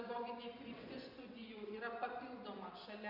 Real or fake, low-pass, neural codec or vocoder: fake; 5.4 kHz; vocoder, 24 kHz, 100 mel bands, Vocos